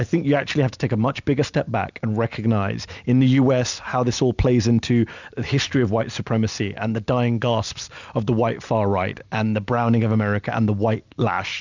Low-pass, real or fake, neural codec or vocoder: 7.2 kHz; real; none